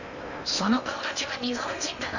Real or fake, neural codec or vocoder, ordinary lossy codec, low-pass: fake; codec, 16 kHz in and 24 kHz out, 0.8 kbps, FocalCodec, streaming, 65536 codes; none; 7.2 kHz